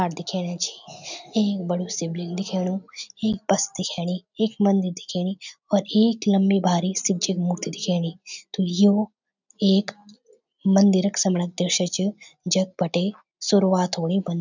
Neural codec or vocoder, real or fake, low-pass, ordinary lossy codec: none; real; 7.2 kHz; none